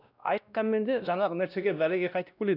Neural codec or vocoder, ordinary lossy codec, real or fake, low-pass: codec, 16 kHz, 1 kbps, X-Codec, WavLM features, trained on Multilingual LibriSpeech; none; fake; 5.4 kHz